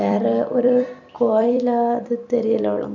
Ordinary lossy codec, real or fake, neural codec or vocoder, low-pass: none; fake; vocoder, 44.1 kHz, 128 mel bands every 256 samples, BigVGAN v2; 7.2 kHz